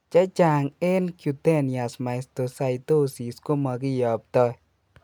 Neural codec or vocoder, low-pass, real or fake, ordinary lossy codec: none; 19.8 kHz; real; none